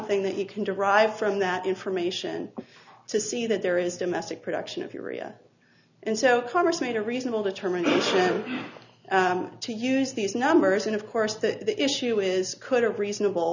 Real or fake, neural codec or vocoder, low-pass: real; none; 7.2 kHz